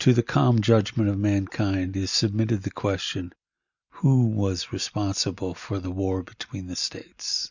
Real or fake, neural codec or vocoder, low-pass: real; none; 7.2 kHz